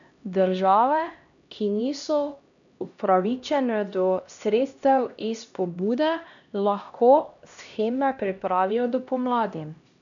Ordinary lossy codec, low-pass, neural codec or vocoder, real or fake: none; 7.2 kHz; codec, 16 kHz, 1 kbps, X-Codec, HuBERT features, trained on LibriSpeech; fake